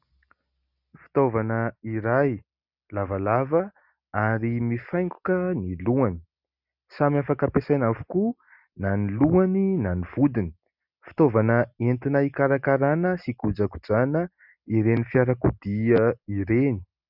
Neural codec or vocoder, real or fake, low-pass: none; real; 5.4 kHz